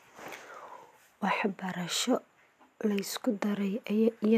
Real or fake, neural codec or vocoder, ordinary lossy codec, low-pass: real; none; AAC, 96 kbps; 14.4 kHz